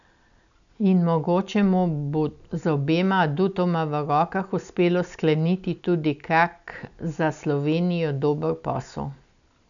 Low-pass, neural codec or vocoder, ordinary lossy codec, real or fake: 7.2 kHz; none; none; real